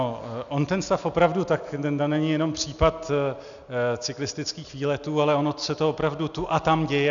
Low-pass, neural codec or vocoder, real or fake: 7.2 kHz; none; real